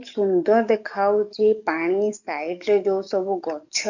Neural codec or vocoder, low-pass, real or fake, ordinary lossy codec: codec, 44.1 kHz, 7.8 kbps, DAC; 7.2 kHz; fake; none